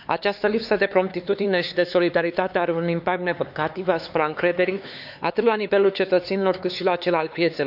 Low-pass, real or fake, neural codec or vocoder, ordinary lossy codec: 5.4 kHz; fake; codec, 16 kHz, 4 kbps, X-Codec, HuBERT features, trained on LibriSpeech; none